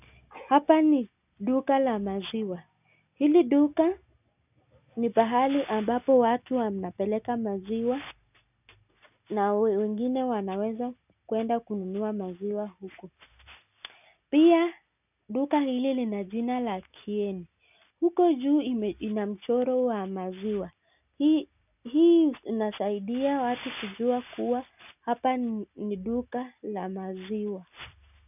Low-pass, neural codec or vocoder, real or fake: 3.6 kHz; none; real